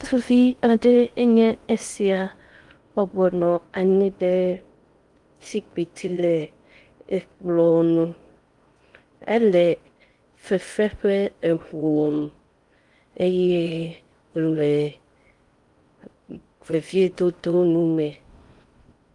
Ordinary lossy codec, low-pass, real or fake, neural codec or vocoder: Opus, 32 kbps; 10.8 kHz; fake; codec, 16 kHz in and 24 kHz out, 0.6 kbps, FocalCodec, streaming, 2048 codes